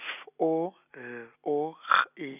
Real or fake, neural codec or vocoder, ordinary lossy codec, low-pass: real; none; none; 3.6 kHz